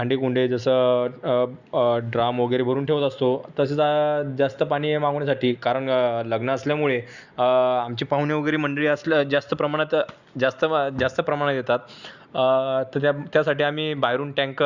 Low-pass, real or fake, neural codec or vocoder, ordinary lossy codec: 7.2 kHz; real; none; Opus, 64 kbps